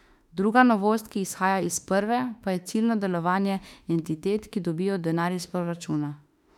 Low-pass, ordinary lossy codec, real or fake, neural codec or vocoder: 19.8 kHz; none; fake; autoencoder, 48 kHz, 32 numbers a frame, DAC-VAE, trained on Japanese speech